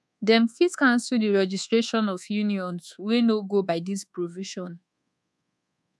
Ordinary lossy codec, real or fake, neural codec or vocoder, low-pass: none; fake; codec, 24 kHz, 1.2 kbps, DualCodec; none